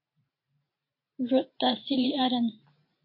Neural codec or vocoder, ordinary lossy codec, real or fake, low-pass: vocoder, 44.1 kHz, 128 mel bands every 512 samples, BigVGAN v2; MP3, 32 kbps; fake; 5.4 kHz